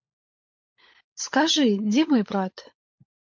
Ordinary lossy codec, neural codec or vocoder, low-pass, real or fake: MP3, 48 kbps; codec, 16 kHz, 16 kbps, FunCodec, trained on LibriTTS, 50 frames a second; 7.2 kHz; fake